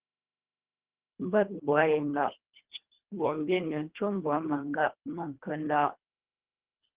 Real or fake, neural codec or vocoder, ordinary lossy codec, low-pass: fake; codec, 24 kHz, 1.5 kbps, HILCodec; Opus, 16 kbps; 3.6 kHz